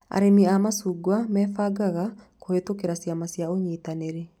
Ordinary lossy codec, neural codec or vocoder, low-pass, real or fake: none; none; 19.8 kHz; real